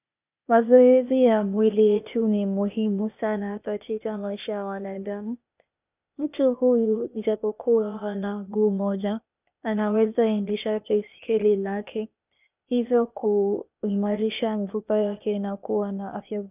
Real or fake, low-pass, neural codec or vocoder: fake; 3.6 kHz; codec, 16 kHz, 0.8 kbps, ZipCodec